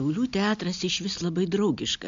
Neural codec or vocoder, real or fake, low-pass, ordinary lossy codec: none; real; 7.2 kHz; MP3, 64 kbps